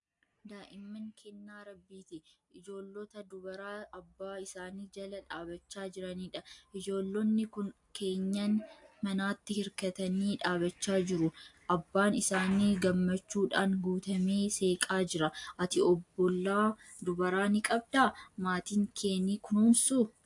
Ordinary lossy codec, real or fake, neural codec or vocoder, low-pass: AAC, 64 kbps; real; none; 10.8 kHz